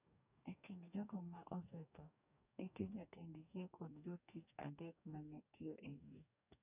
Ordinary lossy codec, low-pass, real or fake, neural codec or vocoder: none; 3.6 kHz; fake; codec, 44.1 kHz, 2.6 kbps, DAC